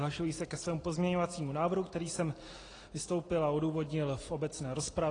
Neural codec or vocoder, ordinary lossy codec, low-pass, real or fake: none; AAC, 32 kbps; 9.9 kHz; real